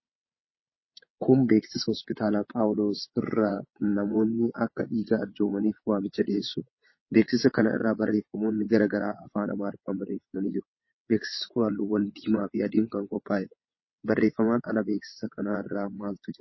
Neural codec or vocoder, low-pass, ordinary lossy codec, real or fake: vocoder, 22.05 kHz, 80 mel bands, WaveNeXt; 7.2 kHz; MP3, 24 kbps; fake